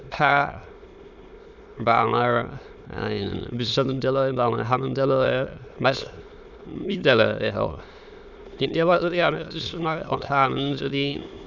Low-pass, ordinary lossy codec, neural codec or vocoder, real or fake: 7.2 kHz; none; autoencoder, 22.05 kHz, a latent of 192 numbers a frame, VITS, trained on many speakers; fake